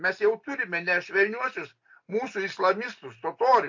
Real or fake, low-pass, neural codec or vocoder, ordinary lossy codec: real; 7.2 kHz; none; MP3, 48 kbps